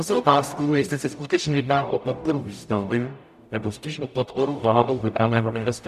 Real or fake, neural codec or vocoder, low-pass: fake; codec, 44.1 kHz, 0.9 kbps, DAC; 14.4 kHz